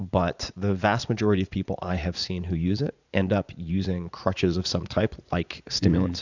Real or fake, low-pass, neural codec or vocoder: fake; 7.2 kHz; vocoder, 44.1 kHz, 80 mel bands, Vocos